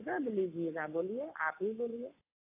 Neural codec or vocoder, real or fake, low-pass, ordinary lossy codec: codec, 44.1 kHz, 7.8 kbps, Pupu-Codec; fake; 3.6 kHz; AAC, 32 kbps